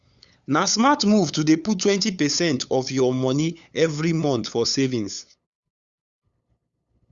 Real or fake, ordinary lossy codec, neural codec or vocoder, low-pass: fake; Opus, 64 kbps; codec, 16 kHz, 8 kbps, FunCodec, trained on LibriTTS, 25 frames a second; 7.2 kHz